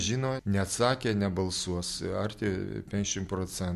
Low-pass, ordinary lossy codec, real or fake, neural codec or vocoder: 14.4 kHz; MP3, 64 kbps; real; none